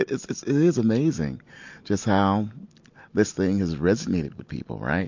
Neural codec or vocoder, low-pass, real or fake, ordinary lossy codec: none; 7.2 kHz; real; MP3, 48 kbps